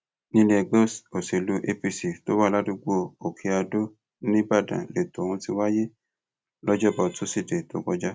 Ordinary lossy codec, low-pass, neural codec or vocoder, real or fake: none; none; none; real